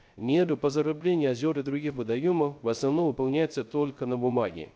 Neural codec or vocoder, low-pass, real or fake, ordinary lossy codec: codec, 16 kHz, 0.3 kbps, FocalCodec; none; fake; none